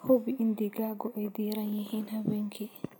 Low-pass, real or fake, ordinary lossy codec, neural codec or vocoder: none; real; none; none